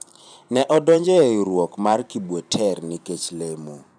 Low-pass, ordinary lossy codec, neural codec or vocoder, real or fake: 9.9 kHz; none; vocoder, 44.1 kHz, 128 mel bands every 512 samples, BigVGAN v2; fake